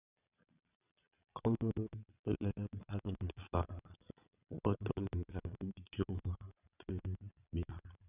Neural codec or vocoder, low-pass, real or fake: vocoder, 22.05 kHz, 80 mel bands, Vocos; 3.6 kHz; fake